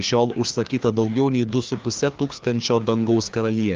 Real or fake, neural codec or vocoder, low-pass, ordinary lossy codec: fake; codec, 16 kHz, 2 kbps, FreqCodec, larger model; 7.2 kHz; Opus, 24 kbps